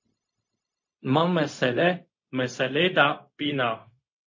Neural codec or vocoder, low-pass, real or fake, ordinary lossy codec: codec, 16 kHz, 0.4 kbps, LongCat-Audio-Codec; 7.2 kHz; fake; MP3, 32 kbps